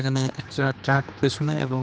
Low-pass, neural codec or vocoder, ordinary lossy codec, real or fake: none; codec, 16 kHz, 2 kbps, X-Codec, HuBERT features, trained on general audio; none; fake